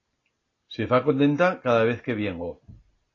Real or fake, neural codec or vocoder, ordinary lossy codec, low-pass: real; none; AAC, 32 kbps; 7.2 kHz